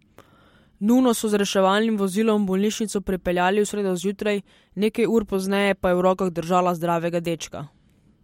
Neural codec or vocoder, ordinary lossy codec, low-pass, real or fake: none; MP3, 64 kbps; 19.8 kHz; real